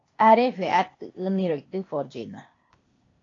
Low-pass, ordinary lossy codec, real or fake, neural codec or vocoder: 7.2 kHz; AAC, 32 kbps; fake; codec, 16 kHz, 0.8 kbps, ZipCodec